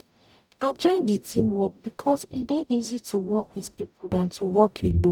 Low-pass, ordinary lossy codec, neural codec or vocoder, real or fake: 19.8 kHz; none; codec, 44.1 kHz, 0.9 kbps, DAC; fake